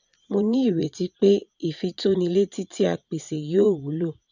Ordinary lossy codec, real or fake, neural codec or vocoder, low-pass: none; fake; vocoder, 44.1 kHz, 80 mel bands, Vocos; 7.2 kHz